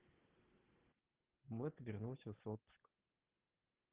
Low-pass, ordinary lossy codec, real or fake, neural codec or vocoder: 3.6 kHz; Opus, 16 kbps; real; none